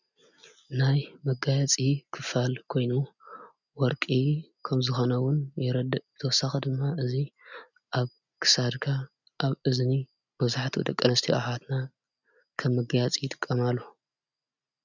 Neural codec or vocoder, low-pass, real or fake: none; 7.2 kHz; real